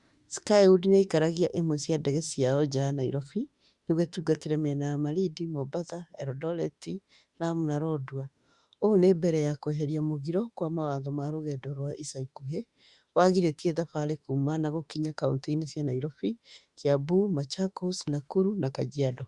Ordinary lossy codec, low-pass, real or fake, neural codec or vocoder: Opus, 64 kbps; 10.8 kHz; fake; autoencoder, 48 kHz, 32 numbers a frame, DAC-VAE, trained on Japanese speech